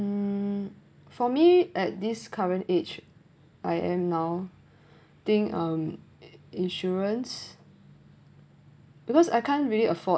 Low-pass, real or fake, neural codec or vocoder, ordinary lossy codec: none; real; none; none